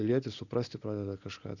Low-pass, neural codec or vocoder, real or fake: 7.2 kHz; none; real